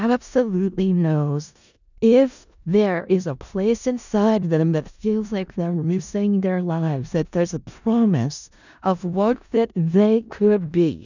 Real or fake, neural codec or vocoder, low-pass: fake; codec, 16 kHz in and 24 kHz out, 0.4 kbps, LongCat-Audio-Codec, four codebook decoder; 7.2 kHz